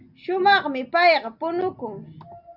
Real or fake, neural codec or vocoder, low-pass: real; none; 5.4 kHz